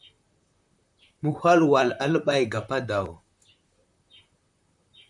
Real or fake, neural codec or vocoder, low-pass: fake; vocoder, 44.1 kHz, 128 mel bands, Pupu-Vocoder; 10.8 kHz